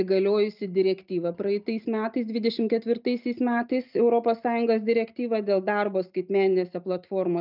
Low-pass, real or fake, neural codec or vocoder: 5.4 kHz; real; none